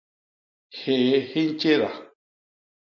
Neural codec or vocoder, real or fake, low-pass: none; real; 7.2 kHz